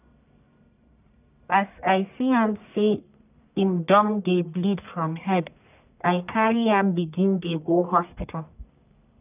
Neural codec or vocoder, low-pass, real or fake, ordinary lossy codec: codec, 44.1 kHz, 1.7 kbps, Pupu-Codec; 3.6 kHz; fake; none